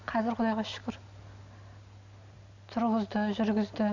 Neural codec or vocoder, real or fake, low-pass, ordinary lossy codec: none; real; 7.2 kHz; none